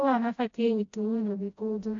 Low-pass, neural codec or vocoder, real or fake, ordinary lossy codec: 7.2 kHz; codec, 16 kHz, 0.5 kbps, FreqCodec, smaller model; fake; AAC, 48 kbps